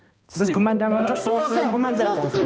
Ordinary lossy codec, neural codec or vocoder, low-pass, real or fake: none; codec, 16 kHz, 1 kbps, X-Codec, HuBERT features, trained on balanced general audio; none; fake